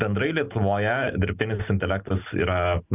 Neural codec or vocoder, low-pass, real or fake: none; 3.6 kHz; real